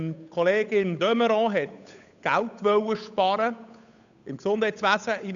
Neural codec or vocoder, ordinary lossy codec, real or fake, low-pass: codec, 16 kHz, 8 kbps, FunCodec, trained on Chinese and English, 25 frames a second; none; fake; 7.2 kHz